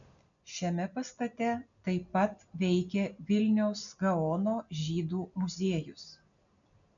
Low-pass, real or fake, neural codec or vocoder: 7.2 kHz; real; none